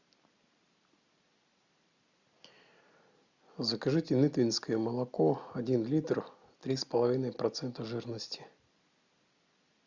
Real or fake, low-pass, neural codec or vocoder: real; 7.2 kHz; none